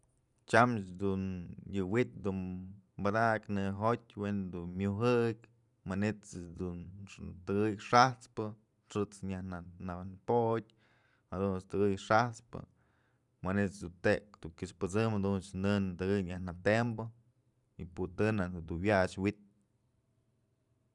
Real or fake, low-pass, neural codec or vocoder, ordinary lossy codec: fake; 10.8 kHz; vocoder, 44.1 kHz, 128 mel bands every 512 samples, BigVGAN v2; none